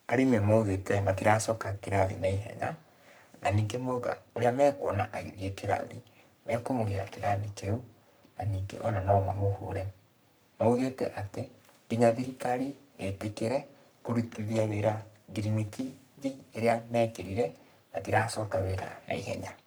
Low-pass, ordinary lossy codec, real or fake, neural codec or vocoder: none; none; fake; codec, 44.1 kHz, 3.4 kbps, Pupu-Codec